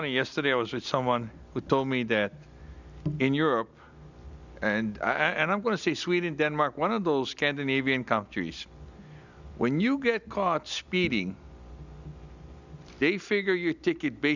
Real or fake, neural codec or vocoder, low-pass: real; none; 7.2 kHz